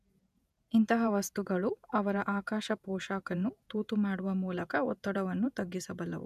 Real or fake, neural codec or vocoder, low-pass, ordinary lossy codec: fake; vocoder, 48 kHz, 128 mel bands, Vocos; 14.4 kHz; none